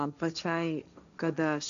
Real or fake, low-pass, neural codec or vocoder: fake; 7.2 kHz; codec, 16 kHz, 1.1 kbps, Voila-Tokenizer